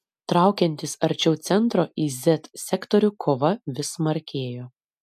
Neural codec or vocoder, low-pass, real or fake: none; 14.4 kHz; real